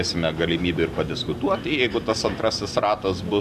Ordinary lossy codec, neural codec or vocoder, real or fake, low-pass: MP3, 96 kbps; none; real; 14.4 kHz